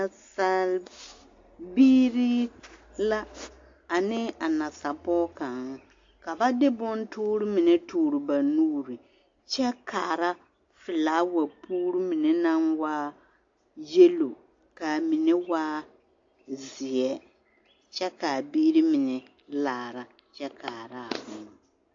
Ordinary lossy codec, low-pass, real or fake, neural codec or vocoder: AAC, 48 kbps; 7.2 kHz; real; none